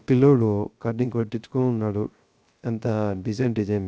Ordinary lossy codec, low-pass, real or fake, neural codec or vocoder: none; none; fake; codec, 16 kHz, 0.3 kbps, FocalCodec